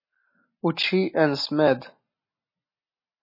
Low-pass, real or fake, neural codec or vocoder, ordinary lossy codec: 5.4 kHz; real; none; MP3, 24 kbps